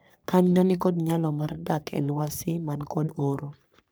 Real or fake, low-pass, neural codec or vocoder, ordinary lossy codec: fake; none; codec, 44.1 kHz, 3.4 kbps, Pupu-Codec; none